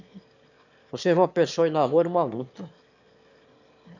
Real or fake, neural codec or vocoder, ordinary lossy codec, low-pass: fake; autoencoder, 22.05 kHz, a latent of 192 numbers a frame, VITS, trained on one speaker; none; 7.2 kHz